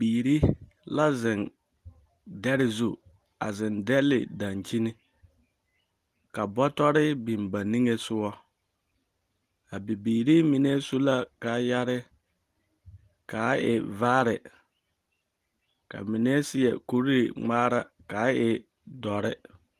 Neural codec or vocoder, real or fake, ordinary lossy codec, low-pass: none; real; Opus, 24 kbps; 14.4 kHz